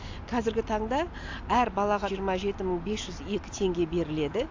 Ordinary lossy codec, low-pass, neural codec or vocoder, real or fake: AAC, 48 kbps; 7.2 kHz; none; real